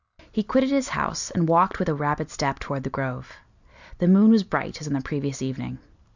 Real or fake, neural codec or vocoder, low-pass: real; none; 7.2 kHz